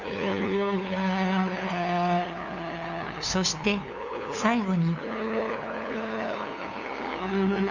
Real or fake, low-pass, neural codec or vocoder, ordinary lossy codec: fake; 7.2 kHz; codec, 16 kHz, 2 kbps, FunCodec, trained on LibriTTS, 25 frames a second; none